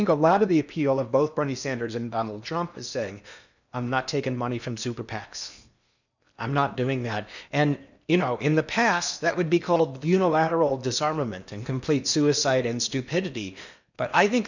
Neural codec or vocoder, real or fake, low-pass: codec, 16 kHz in and 24 kHz out, 0.8 kbps, FocalCodec, streaming, 65536 codes; fake; 7.2 kHz